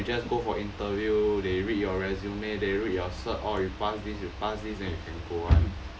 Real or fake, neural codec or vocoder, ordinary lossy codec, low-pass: real; none; none; none